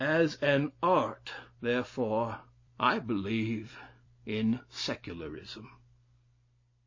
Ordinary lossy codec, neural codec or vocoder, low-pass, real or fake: MP3, 32 kbps; none; 7.2 kHz; real